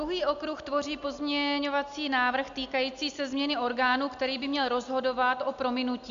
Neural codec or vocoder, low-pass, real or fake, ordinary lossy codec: none; 7.2 kHz; real; MP3, 64 kbps